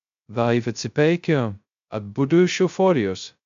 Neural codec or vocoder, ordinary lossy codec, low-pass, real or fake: codec, 16 kHz, 0.2 kbps, FocalCodec; MP3, 64 kbps; 7.2 kHz; fake